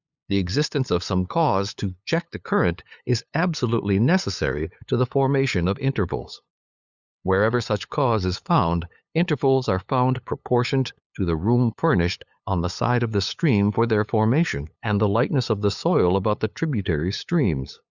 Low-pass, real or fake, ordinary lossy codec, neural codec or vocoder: 7.2 kHz; fake; Opus, 64 kbps; codec, 16 kHz, 8 kbps, FunCodec, trained on LibriTTS, 25 frames a second